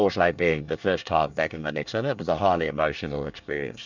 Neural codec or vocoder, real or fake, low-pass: codec, 24 kHz, 1 kbps, SNAC; fake; 7.2 kHz